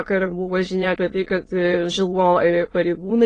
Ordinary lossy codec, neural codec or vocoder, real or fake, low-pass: AAC, 32 kbps; autoencoder, 22.05 kHz, a latent of 192 numbers a frame, VITS, trained on many speakers; fake; 9.9 kHz